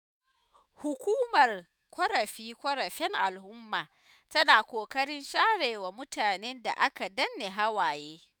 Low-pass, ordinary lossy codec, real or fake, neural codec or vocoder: none; none; fake; autoencoder, 48 kHz, 128 numbers a frame, DAC-VAE, trained on Japanese speech